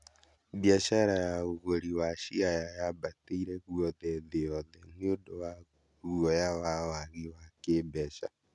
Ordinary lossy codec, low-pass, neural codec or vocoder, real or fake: none; 10.8 kHz; none; real